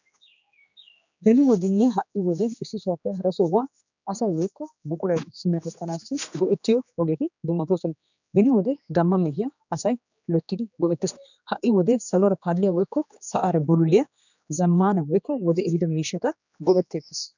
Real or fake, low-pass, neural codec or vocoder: fake; 7.2 kHz; codec, 16 kHz, 2 kbps, X-Codec, HuBERT features, trained on general audio